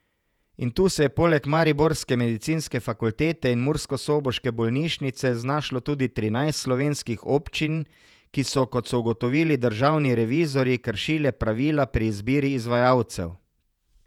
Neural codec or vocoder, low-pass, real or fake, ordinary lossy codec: vocoder, 48 kHz, 128 mel bands, Vocos; 19.8 kHz; fake; none